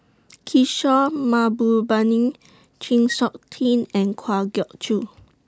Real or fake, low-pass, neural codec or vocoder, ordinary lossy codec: real; none; none; none